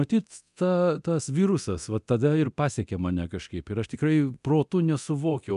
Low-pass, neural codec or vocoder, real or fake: 10.8 kHz; codec, 24 kHz, 0.9 kbps, DualCodec; fake